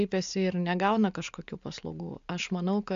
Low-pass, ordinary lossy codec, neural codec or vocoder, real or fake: 7.2 kHz; MP3, 64 kbps; none; real